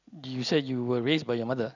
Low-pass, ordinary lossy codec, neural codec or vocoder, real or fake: 7.2 kHz; none; none; real